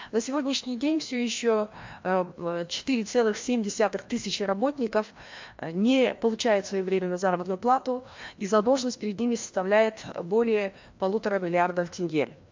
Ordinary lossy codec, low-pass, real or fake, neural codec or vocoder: MP3, 48 kbps; 7.2 kHz; fake; codec, 16 kHz, 1 kbps, FreqCodec, larger model